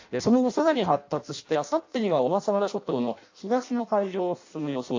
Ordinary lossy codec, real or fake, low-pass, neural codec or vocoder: AAC, 48 kbps; fake; 7.2 kHz; codec, 16 kHz in and 24 kHz out, 0.6 kbps, FireRedTTS-2 codec